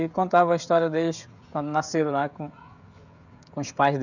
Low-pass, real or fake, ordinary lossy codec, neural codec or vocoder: 7.2 kHz; fake; none; codec, 16 kHz, 16 kbps, FreqCodec, smaller model